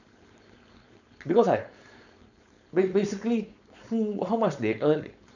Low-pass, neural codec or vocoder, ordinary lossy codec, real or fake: 7.2 kHz; codec, 16 kHz, 4.8 kbps, FACodec; none; fake